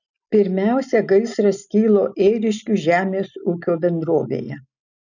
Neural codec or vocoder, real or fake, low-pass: none; real; 7.2 kHz